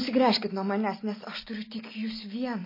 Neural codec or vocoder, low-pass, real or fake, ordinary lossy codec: none; 5.4 kHz; real; MP3, 24 kbps